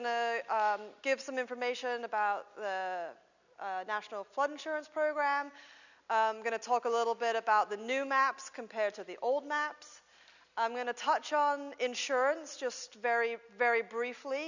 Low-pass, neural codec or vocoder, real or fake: 7.2 kHz; none; real